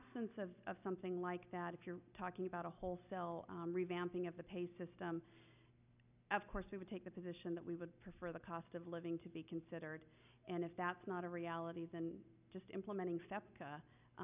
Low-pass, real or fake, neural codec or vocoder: 3.6 kHz; real; none